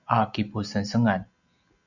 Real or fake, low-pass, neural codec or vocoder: real; 7.2 kHz; none